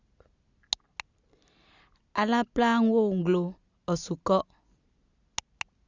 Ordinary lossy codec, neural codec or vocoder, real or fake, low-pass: Opus, 64 kbps; none; real; 7.2 kHz